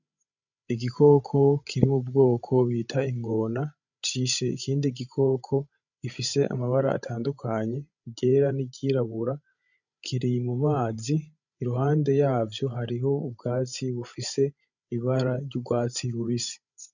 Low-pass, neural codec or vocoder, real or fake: 7.2 kHz; codec, 16 kHz, 16 kbps, FreqCodec, larger model; fake